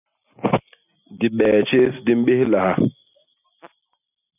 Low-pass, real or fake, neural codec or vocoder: 3.6 kHz; real; none